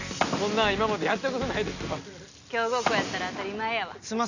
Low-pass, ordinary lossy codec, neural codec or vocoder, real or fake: 7.2 kHz; none; none; real